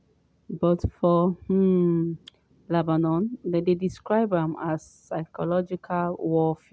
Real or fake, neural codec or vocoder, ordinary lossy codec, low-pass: real; none; none; none